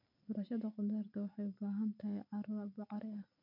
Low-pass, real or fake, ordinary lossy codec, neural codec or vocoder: 5.4 kHz; real; none; none